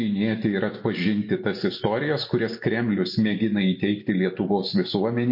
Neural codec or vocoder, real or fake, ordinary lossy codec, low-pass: none; real; MP3, 32 kbps; 5.4 kHz